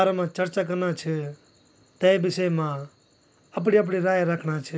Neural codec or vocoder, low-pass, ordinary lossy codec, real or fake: none; none; none; real